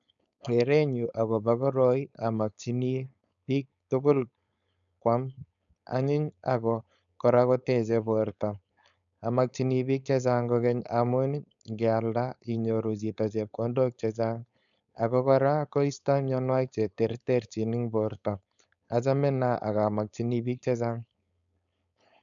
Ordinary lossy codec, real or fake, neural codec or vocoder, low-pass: none; fake; codec, 16 kHz, 4.8 kbps, FACodec; 7.2 kHz